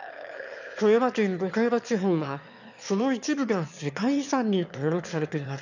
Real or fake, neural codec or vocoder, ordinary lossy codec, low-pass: fake; autoencoder, 22.05 kHz, a latent of 192 numbers a frame, VITS, trained on one speaker; none; 7.2 kHz